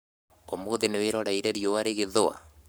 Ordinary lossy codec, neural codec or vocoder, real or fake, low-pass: none; codec, 44.1 kHz, 7.8 kbps, Pupu-Codec; fake; none